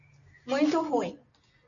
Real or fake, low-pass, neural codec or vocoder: real; 7.2 kHz; none